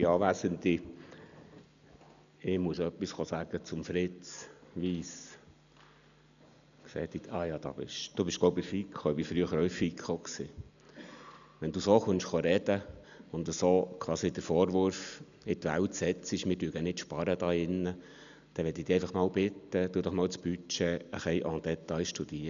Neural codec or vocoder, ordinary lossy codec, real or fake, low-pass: none; MP3, 96 kbps; real; 7.2 kHz